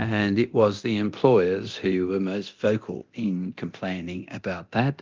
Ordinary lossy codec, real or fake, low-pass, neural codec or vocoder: Opus, 32 kbps; fake; 7.2 kHz; codec, 24 kHz, 0.9 kbps, DualCodec